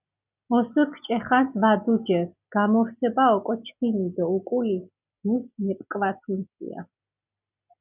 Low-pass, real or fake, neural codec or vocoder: 3.6 kHz; real; none